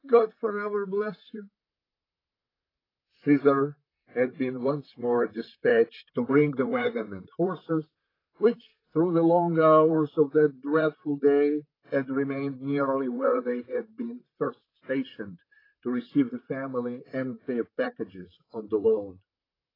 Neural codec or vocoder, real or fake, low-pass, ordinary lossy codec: vocoder, 44.1 kHz, 128 mel bands, Pupu-Vocoder; fake; 5.4 kHz; AAC, 24 kbps